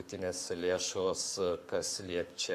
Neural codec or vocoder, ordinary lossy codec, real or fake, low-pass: codec, 44.1 kHz, 7.8 kbps, Pupu-Codec; AAC, 96 kbps; fake; 14.4 kHz